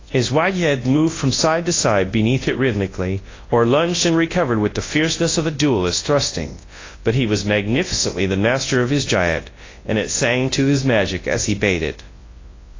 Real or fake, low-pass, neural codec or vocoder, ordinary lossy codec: fake; 7.2 kHz; codec, 24 kHz, 0.9 kbps, WavTokenizer, large speech release; AAC, 32 kbps